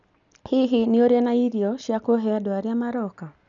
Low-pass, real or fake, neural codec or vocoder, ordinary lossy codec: 7.2 kHz; real; none; none